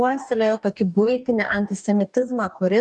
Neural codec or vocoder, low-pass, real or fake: codec, 44.1 kHz, 2.6 kbps, DAC; 10.8 kHz; fake